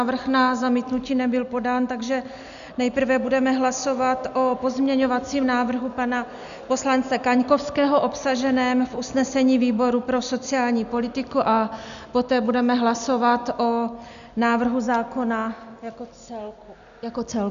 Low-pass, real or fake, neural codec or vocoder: 7.2 kHz; real; none